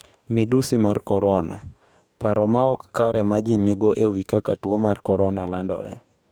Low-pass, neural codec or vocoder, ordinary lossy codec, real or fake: none; codec, 44.1 kHz, 2.6 kbps, DAC; none; fake